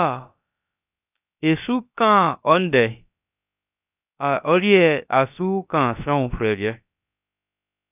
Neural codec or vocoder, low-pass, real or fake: codec, 16 kHz, about 1 kbps, DyCAST, with the encoder's durations; 3.6 kHz; fake